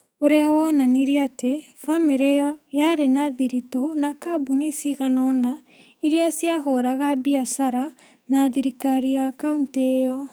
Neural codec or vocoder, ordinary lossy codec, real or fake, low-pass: codec, 44.1 kHz, 2.6 kbps, SNAC; none; fake; none